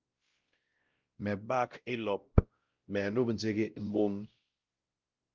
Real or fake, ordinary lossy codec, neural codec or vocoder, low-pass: fake; Opus, 32 kbps; codec, 16 kHz, 0.5 kbps, X-Codec, WavLM features, trained on Multilingual LibriSpeech; 7.2 kHz